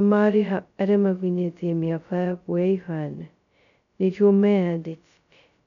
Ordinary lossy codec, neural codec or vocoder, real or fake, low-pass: none; codec, 16 kHz, 0.2 kbps, FocalCodec; fake; 7.2 kHz